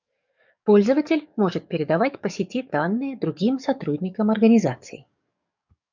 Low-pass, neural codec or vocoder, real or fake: 7.2 kHz; codec, 44.1 kHz, 7.8 kbps, DAC; fake